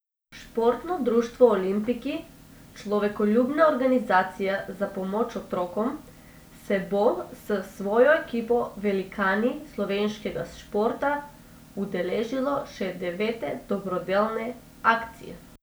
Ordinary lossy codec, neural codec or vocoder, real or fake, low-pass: none; none; real; none